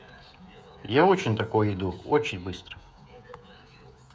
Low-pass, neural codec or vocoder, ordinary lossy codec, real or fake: none; codec, 16 kHz, 16 kbps, FreqCodec, smaller model; none; fake